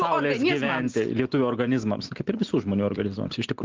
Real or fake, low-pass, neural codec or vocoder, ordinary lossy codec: real; 7.2 kHz; none; Opus, 16 kbps